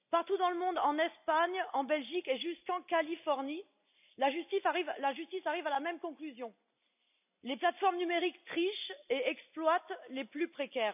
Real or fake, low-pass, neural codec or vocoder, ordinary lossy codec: real; 3.6 kHz; none; none